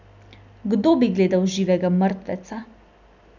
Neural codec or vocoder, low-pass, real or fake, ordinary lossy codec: none; 7.2 kHz; real; none